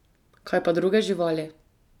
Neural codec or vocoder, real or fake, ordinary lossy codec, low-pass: none; real; none; 19.8 kHz